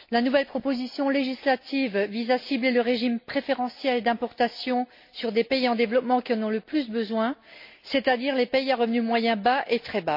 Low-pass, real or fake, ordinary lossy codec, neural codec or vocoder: 5.4 kHz; real; MP3, 32 kbps; none